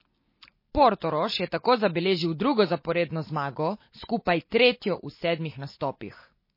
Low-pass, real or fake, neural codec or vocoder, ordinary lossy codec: 5.4 kHz; real; none; MP3, 24 kbps